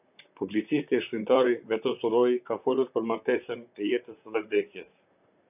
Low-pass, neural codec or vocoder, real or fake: 3.6 kHz; vocoder, 44.1 kHz, 128 mel bands, Pupu-Vocoder; fake